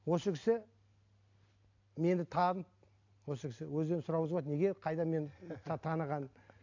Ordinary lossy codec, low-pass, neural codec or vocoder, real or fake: AAC, 48 kbps; 7.2 kHz; none; real